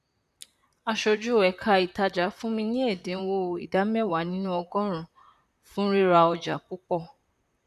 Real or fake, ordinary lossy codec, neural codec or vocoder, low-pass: fake; none; vocoder, 44.1 kHz, 128 mel bands, Pupu-Vocoder; 14.4 kHz